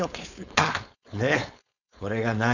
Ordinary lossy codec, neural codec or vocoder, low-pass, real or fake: none; codec, 16 kHz, 4.8 kbps, FACodec; 7.2 kHz; fake